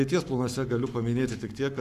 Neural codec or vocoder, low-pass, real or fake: codec, 44.1 kHz, 7.8 kbps, Pupu-Codec; 14.4 kHz; fake